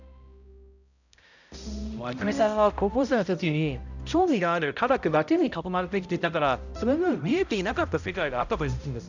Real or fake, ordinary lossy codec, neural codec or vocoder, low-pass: fake; none; codec, 16 kHz, 0.5 kbps, X-Codec, HuBERT features, trained on balanced general audio; 7.2 kHz